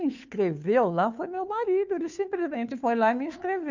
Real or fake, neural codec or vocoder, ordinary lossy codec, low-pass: fake; codec, 16 kHz, 2 kbps, FunCodec, trained on Chinese and English, 25 frames a second; none; 7.2 kHz